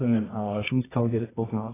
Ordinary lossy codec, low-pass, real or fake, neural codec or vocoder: AAC, 16 kbps; 3.6 kHz; fake; codec, 24 kHz, 0.9 kbps, WavTokenizer, medium music audio release